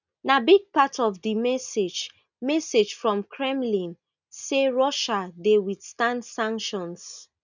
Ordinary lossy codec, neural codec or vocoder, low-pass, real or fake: none; none; 7.2 kHz; real